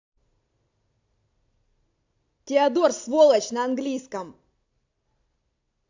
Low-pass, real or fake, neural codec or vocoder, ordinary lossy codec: 7.2 kHz; real; none; AAC, 48 kbps